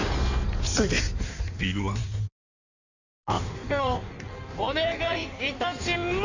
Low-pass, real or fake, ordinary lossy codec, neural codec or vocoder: 7.2 kHz; fake; none; codec, 16 kHz in and 24 kHz out, 1.1 kbps, FireRedTTS-2 codec